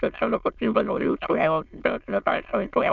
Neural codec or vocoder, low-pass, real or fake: autoencoder, 22.05 kHz, a latent of 192 numbers a frame, VITS, trained on many speakers; 7.2 kHz; fake